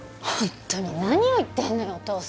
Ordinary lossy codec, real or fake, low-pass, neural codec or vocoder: none; real; none; none